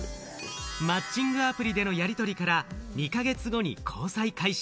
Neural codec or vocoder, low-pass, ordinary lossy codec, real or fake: none; none; none; real